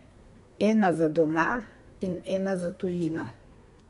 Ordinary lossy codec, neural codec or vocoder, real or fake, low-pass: none; codec, 24 kHz, 1 kbps, SNAC; fake; 10.8 kHz